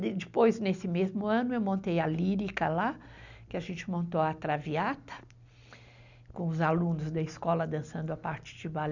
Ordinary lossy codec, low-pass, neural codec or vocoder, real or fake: none; 7.2 kHz; none; real